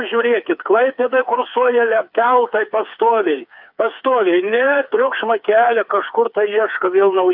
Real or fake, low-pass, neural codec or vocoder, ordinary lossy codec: fake; 5.4 kHz; codec, 16 kHz, 4 kbps, FreqCodec, smaller model; AAC, 48 kbps